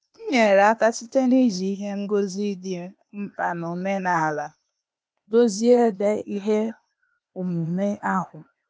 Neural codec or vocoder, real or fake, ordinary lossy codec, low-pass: codec, 16 kHz, 0.8 kbps, ZipCodec; fake; none; none